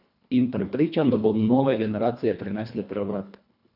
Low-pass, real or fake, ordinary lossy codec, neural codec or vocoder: 5.4 kHz; fake; none; codec, 24 kHz, 1.5 kbps, HILCodec